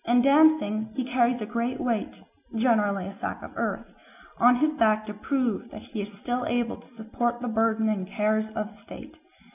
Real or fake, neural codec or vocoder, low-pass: real; none; 3.6 kHz